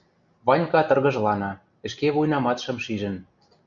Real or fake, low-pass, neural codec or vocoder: real; 7.2 kHz; none